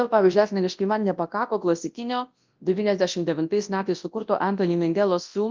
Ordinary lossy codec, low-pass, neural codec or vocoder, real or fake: Opus, 32 kbps; 7.2 kHz; codec, 24 kHz, 0.9 kbps, WavTokenizer, large speech release; fake